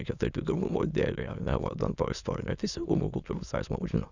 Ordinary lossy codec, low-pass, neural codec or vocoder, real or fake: Opus, 64 kbps; 7.2 kHz; autoencoder, 22.05 kHz, a latent of 192 numbers a frame, VITS, trained on many speakers; fake